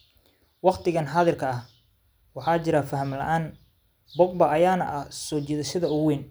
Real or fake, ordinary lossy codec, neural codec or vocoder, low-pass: real; none; none; none